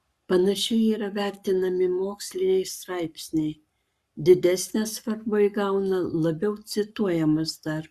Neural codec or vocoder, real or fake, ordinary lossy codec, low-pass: codec, 44.1 kHz, 7.8 kbps, Pupu-Codec; fake; Opus, 64 kbps; 14.4 kHz